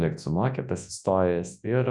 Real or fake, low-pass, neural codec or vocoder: fake; 10.8 kHz; codec, 24 kHz, 0.9 kbps, WavTokenizer, large speech release